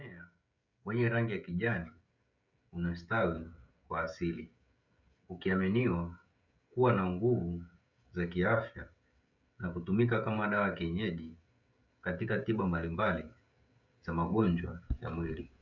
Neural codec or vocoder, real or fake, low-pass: codec, 16 kHz, 16 kbps, FreqCodec, smaller model; fake; 7.2 kHz